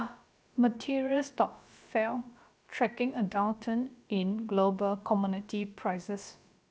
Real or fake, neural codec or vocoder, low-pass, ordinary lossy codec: fake; codec, 16 kHz, about 1 kbps, DyCAST, with the encoder's durations; none; none